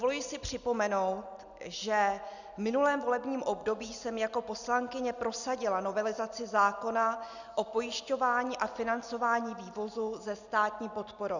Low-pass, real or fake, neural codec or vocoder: 7.2 kHz; real; none